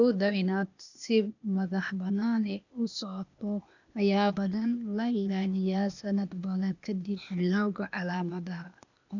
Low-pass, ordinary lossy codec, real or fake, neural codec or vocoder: 7.2 kHz; none; fake; codec, 16 kHz, 0.8 kbps, ZipCodec